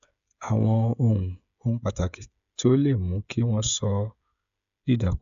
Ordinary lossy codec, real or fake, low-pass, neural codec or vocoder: AAC, 96 kbps; fake; 7.2 kHz; codec, 16 kHz, 16 kbps, FreqCodec, smaller model